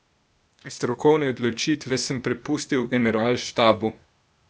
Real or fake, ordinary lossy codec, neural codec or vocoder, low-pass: fake; none; codec, 16 kHz, 0.8 kbps, ZipCodec; none